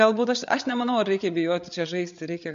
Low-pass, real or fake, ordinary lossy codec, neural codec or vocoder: 7.2 kHz; fake; MP3, 48 kbps; codec, 16 kHz, 8 kbps, FreqCodec, larger model